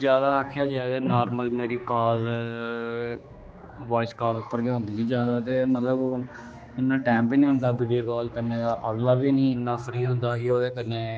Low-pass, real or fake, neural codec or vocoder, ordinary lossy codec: none; fake; codec, 16 kHz, 2 kbps, X-Codec, HuBERT features, trained on general audio; none